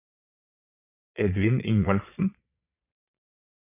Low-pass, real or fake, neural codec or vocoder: 3.6 kHz; fake; vocoder, 22.05 kHz, 80 mel bands, Vocos